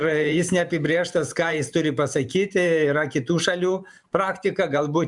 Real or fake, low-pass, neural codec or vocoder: fake; 10.8 kHz; vocoder, 44.1 kHz, 128 mel bands every 512 samples, BigVGAN v2